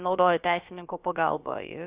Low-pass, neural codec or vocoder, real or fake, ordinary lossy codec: 3.6 kHz; codec, 16 kHz, about 1 kbps, DyCAST, with the encoder's durations; fake; Opus, 64 kbps